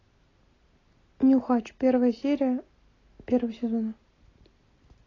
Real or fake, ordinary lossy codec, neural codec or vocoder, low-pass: real; AAC, 32 kbps; none; 7.2 kHz